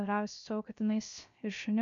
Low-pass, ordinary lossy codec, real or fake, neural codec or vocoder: 7.2 kHz; MP3, 48 kbps; fake; codec, 16 kHz, 0.3 kbps, FocalCodec